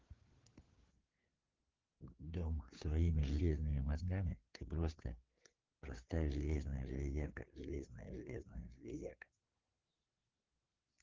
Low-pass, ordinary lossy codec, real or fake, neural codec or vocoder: 7.2 kHz; Opus, 16 kbps; fake; codec, 16 kHz, 4 kbps, FreqCodec, larger model